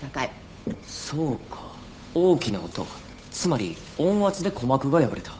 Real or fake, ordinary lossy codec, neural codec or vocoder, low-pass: fake; none; codec, 16 kHz, 8 kbps, FunCodec, trained on Chinese and English, 25 frames a second; none